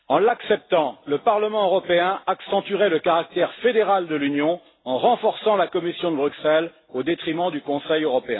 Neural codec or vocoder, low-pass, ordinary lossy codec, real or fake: none; 7.2 kHz; AAC, 16 kbps; real